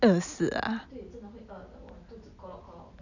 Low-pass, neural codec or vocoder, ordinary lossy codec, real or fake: 7.2 kHz; none; none; real